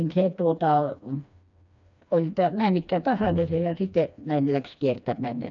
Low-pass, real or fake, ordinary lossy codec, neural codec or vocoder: 7.2 kHz; fake; MP3, 96 kbps; codec, 16 kHz, 2 kbps, FreqCodec, smaller model